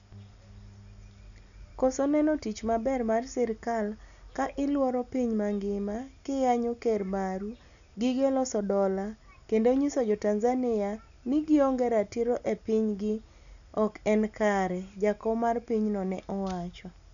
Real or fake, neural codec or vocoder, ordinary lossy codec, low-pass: real; none; none; 7.2 kHz